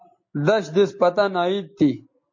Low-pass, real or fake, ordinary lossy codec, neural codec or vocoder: 7.2 kHz; real; MP3, 32 kbps; none